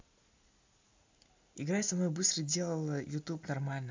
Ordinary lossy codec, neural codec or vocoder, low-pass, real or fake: MP3, 64 kbps; none; 7.2 kHz; real